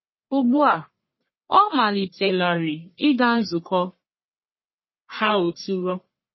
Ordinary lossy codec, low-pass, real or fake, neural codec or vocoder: MP3, 24 kbps; 7.2 kHz; fake; codec, 44.1 kHz, 1.7 kbps, Pupu-Codec